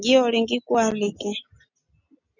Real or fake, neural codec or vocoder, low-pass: real; none; 7.2 kHz